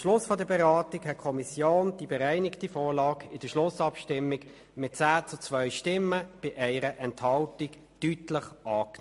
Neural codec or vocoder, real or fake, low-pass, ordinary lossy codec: none; real; 14.4 kHz; MP3, 48 kbps